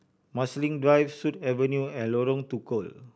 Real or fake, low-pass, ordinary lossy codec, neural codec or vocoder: real; none; none; none